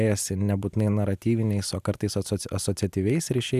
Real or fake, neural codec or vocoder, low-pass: real; none; 14.4 kHz